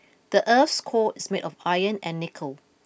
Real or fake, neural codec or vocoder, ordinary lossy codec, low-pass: real; none; none; none